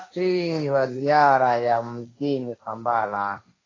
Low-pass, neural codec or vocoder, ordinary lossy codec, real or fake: 7.2 kHz; codec, 16 kHz, 1.1 kbps, Voila-Tokenizer; AAC, 32 kbps; fake